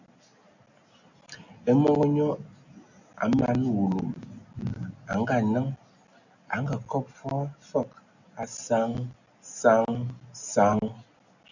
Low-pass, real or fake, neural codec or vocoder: 7.2 kHz; real; none